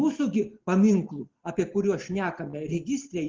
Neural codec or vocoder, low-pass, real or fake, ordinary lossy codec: none; 7.2 kHz; real; Opus, 16 kbps